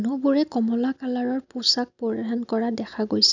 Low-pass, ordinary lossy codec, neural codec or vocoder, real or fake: 7.2 kHz; none; none; real